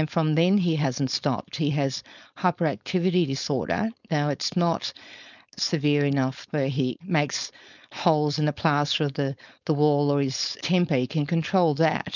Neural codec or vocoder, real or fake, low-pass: codec, 16 kHz, 4.8 kbps, FACodec; fake; 7.2 kHz